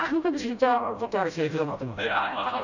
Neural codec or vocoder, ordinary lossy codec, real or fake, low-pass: codec, 16 kHz, 0.5 kbps, FreqCodec, smaller model; none; fake; 7.2 kHz